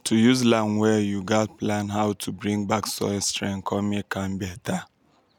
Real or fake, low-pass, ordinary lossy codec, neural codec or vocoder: real; 19.8 kHz; none; none